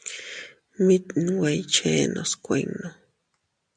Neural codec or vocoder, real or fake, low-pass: none; real; 9.9 kHz